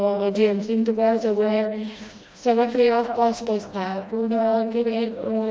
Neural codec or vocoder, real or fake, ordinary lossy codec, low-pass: codec, 16 kHz, 1 kbps, FreqCodec, smaller model; fake; none; none